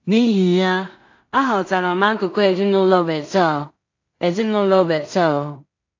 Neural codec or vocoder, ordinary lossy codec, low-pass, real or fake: codec, 16 kHz in and 24 kHz out, 0.4 kbps, LongCat-Audio-Codec, two codebook decoder; AAC, 48 kbps; 7.2 kHz; fake